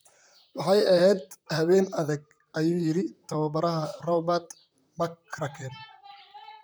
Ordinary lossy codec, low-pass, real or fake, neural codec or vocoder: none; none; fake; vocoder, 44.1 kHz, 128 mel bands, Pupu-Vocoder